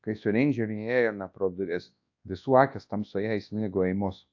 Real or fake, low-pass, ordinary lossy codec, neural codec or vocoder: fake; 7.2 kHz; AAC, 48 kbps; codec, 24 kHz, 0.9 kbps, WavTokenizer, large speech release